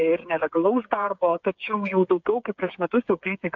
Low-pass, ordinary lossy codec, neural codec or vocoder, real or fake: 7.2 kHz; MP3, 64 kbps; codec, 16 kHz, 8 kbps, FreqCodec, smaller model; fake